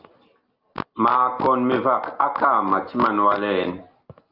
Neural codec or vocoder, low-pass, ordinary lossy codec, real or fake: none; 5.4 kHz; Opus, 24 kbps; real